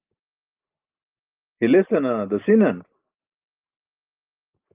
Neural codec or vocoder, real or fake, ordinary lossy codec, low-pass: none; real; Opus, 24 kbps; 3.6 kHz